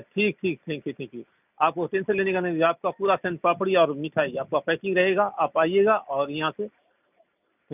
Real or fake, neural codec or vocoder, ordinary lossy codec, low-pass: real; none; none; 3.6 kHz